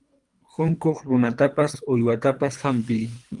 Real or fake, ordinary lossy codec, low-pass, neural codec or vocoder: fake; Opus, 32 kbps; 10.8 kHz; codec, 24 kHz, 3 kbps, HILCodec